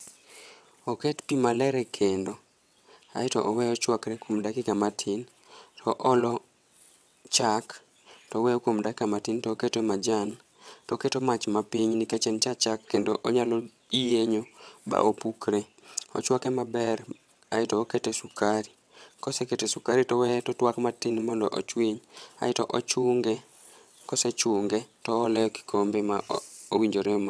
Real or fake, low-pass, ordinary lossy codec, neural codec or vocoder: fake; none; none; vocoder, 22.05 kHz, 80 mel bands, WaveNeXt